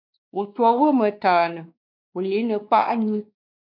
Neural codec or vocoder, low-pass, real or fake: codec, 16 kHz, 2 kbps, X-Codec, WavLM features, trained on Multilingual LibriSpeech; 5.4 kHz; fake